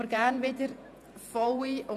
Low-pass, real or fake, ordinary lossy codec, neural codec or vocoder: 14.4 kHz; fake; none; vocoder, 48 kHz, 128 mel bands, Vocos